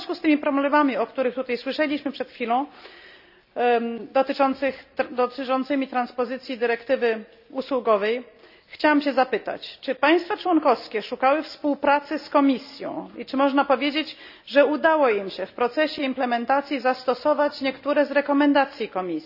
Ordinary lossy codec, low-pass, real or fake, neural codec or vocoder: none; 5.4 kHz; real; none